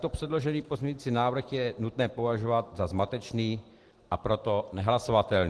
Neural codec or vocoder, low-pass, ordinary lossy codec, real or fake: none; 10.8 kHz; Opus, 16 kbps; real